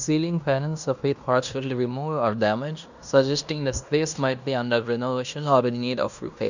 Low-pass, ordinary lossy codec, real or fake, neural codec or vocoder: 7.2 kHz; none; fake; codec, 16 kHz in and 24 kHz out, 0.9 kbps, LongCat-Audio-Codec, fine tuned four codebook decoder